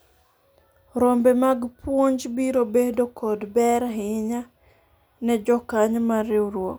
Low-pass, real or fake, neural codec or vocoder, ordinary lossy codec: none; real; none; none